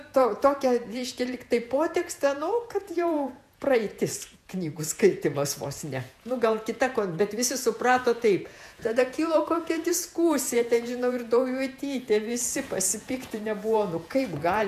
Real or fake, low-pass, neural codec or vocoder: fake; 14.4 kHz; vocoder, 48 kHz, 128 mel bands, Vocos